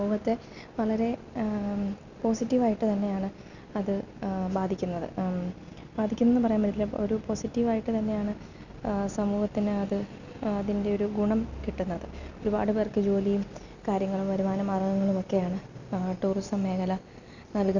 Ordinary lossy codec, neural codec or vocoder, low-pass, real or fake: none; none; 7.2 kHz; real